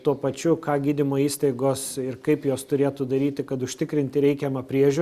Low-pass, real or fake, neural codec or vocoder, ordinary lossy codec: 14.4 kHz; real; none; Opus, 64 kbps